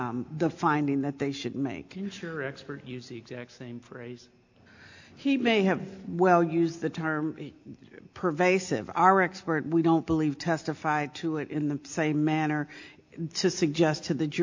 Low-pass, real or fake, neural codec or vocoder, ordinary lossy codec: 7.2 kHz; real; none; AAC, 48 kbps